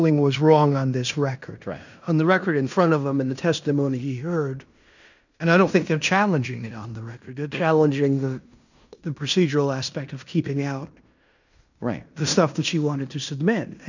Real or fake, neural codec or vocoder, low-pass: fake; codec, 16 kHz in and 24 kHz out, 0.9 kbps, LongCat-Audio-Codec, fine tuned four codebook decoder; 7.2 kHz